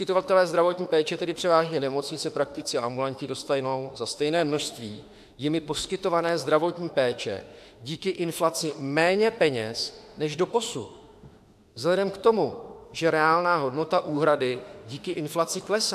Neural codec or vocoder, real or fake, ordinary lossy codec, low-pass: autoencoder, 48 kHz, 32 numbers a frame, DAC-VAE, trained on Japanese speech; fake; AAC, 96 kbps; 14.4 kHz